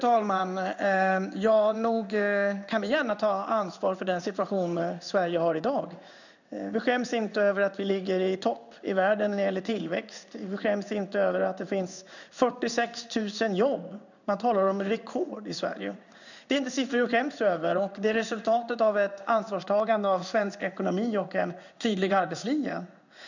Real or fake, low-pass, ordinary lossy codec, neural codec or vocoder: fake; 7.2 kHz; none; codec, 16 kHz in and 24 kHz out, 1 kbps, XY-Tokenizer